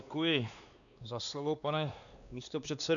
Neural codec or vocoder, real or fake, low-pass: codec, 16 kHz, 2 kbps, X-Codec, WavLM features, trained on Multilingual LibriSpeech; fake; 7.2 kHz